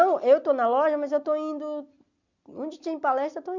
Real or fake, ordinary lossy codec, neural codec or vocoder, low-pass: real; none; none; 7.2 kHz